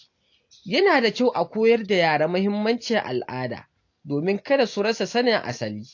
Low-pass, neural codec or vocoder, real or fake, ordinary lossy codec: 7.2 kHz; none; real; AAC, 48 kbps